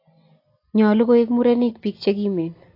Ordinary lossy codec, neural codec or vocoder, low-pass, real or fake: AAC, 32 kbps; none; 5.4 kHz; real